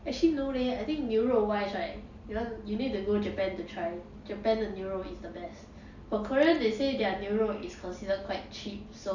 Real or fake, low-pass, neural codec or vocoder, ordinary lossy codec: real; 7.2 kHz; none; none